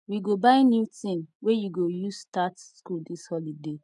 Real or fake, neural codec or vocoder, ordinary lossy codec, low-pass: real; none; none; 10.8 kHz